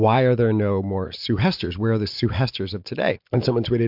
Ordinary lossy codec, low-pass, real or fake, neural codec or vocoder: MP3, 48 kbps; 5.4 kHz; real; none